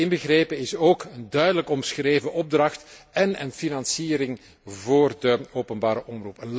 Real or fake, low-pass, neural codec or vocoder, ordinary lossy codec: real; none; none; none